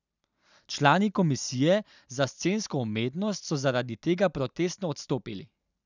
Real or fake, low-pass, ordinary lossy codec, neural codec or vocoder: real; 7.2 kHz; none; none